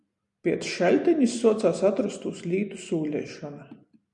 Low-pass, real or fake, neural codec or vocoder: 10.8 kHz; real; none